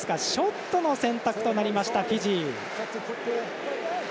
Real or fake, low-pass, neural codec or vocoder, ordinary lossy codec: real; none; none; none